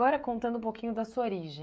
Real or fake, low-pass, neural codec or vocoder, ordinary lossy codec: fake; none; codec, 16 kHz, 16 kbps, FreqCodec, smaller model; none